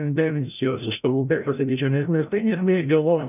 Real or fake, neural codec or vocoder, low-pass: fake; codec, 16 kHz, 0.5 kbps, FreqCodec, larger model; 3.6 kHz